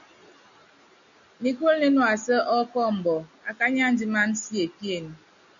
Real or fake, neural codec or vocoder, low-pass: real; none; 7.2 kHz